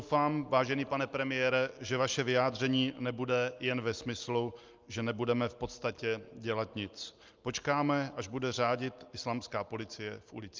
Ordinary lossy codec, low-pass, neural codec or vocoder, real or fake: Opus, 24 kbps; 7.2 kHz; none; real